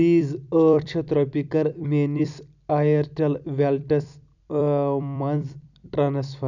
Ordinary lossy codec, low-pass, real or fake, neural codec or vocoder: none; 7.2 kHz; fake; vocoder, 44.1 kHz, 128 mel bands every 256 samples, BigVGAN v2